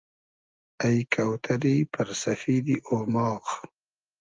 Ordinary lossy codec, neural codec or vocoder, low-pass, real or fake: Opus, 32 kbps; none; 7.2 kHz; real